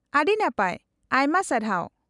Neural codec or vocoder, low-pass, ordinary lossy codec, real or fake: none; 10.8 kHz; none; real